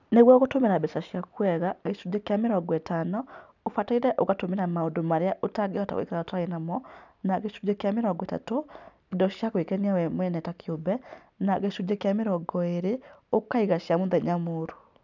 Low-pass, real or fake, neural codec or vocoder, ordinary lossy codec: 7.2 kHz; real; none; none